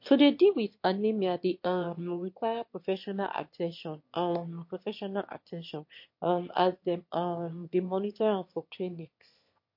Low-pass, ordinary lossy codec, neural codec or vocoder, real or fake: 5.4 kHz; MP3, 32 kbps; autoencoder, 22.05 kHz, a latent of 192 numbers a frame, VITS, trained on one speaker; fake